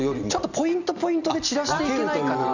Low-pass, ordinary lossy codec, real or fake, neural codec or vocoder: 7.2 kHz; none; real; none